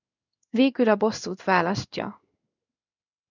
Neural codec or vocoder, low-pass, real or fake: codec, 16 kHz in and 24 kHz out, 1 kbps, XY-Tokenizer; 7.2 kHz; fake